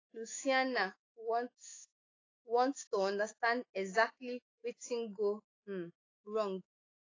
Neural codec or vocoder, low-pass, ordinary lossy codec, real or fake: autoencoder, 48 kHz, 128 numbers a frame, DAC-VAE, trained on Japanese speech; 7.2 kHz; AAC, 32 kbps; fake